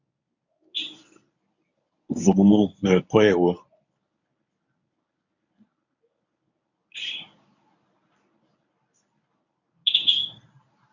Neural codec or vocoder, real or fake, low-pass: codec, 24 kHz, 0.9 kbps, WavTokenizer, medium speech release version 1; fake; 7.2 kHz